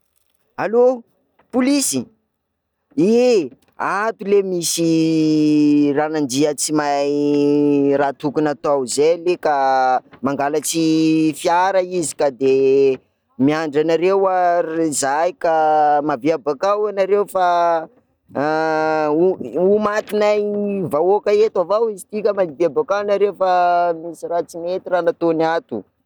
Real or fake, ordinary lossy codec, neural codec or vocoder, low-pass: real; none; none; 19.8 kHz